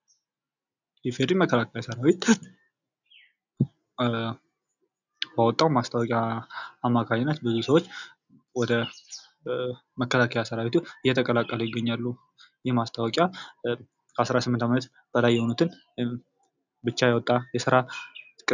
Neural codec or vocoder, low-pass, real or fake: none; 7.2 kHz; real